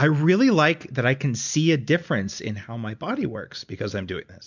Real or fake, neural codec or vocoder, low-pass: real; none; 7.2 kHz